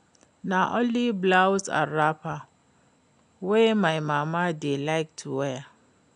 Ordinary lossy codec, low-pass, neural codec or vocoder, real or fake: none; 9.9 kHz; none; real